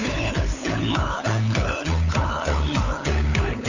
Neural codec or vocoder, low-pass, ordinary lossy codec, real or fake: codec, 16 kHz, 4 kbps, FunCodec, trained on LibriTTS, 50 frames a second; 7.2 kHz; none; fake